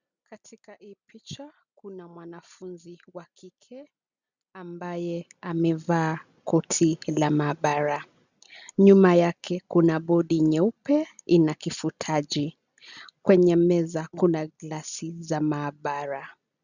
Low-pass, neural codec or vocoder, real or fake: 7.2 kHz; none; real